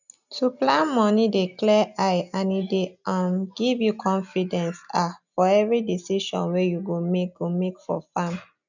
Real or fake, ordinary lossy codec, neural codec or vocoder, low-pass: real; none; none; 7.2 kHz